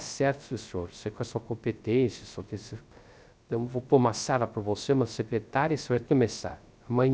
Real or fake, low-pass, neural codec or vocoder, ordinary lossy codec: fake; none; codec, 16 kHz, 0.3 kbps, FocalCodec; none